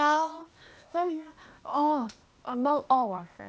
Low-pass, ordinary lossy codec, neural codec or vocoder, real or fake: none; none; codec, 16 kHz, 0.8 kbps, ZipCodec; fake